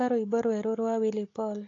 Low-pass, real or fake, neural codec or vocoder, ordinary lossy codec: 7.2 kHz; real; none; AAC, 32 kbps